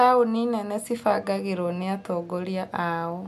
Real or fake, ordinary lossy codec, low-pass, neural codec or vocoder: real; MP3, 96 kbps; 14.4 kHz; none